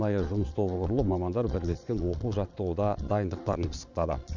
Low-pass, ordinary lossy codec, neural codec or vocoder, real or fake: 7.2 kHz; none; vocoder, 22.05 kHz, 80 mel bands, Vocos; fake